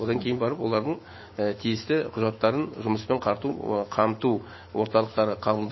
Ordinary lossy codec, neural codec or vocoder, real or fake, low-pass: MP3, 24 kbps; autoencoder, 48 kHz, 128 numbers a frame, DAC-VAE, trained on Japanese speech; fake; 7.2 kHz